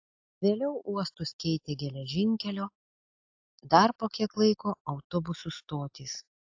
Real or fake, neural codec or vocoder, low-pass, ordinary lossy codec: real; none; 7.2 kHz; AAC, 48 kbps